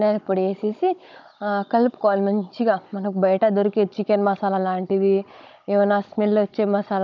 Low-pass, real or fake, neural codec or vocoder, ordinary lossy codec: 7.2 kHz; fake; codec, 16 kHz, 16 kbps, FunCodec, trained on Chinese and English, 50 frames a second; none